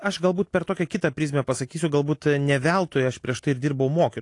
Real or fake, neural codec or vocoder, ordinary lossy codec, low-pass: real; none; AAC, 48 kbps; 10.8 kHz